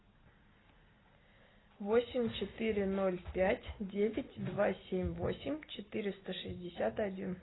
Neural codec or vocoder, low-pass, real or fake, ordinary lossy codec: none; 7.2 kHz; real; AAC, 16 kbps